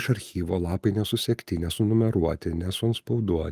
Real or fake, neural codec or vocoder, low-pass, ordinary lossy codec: real; none; 14.4 kHz; Opus, 32 kbps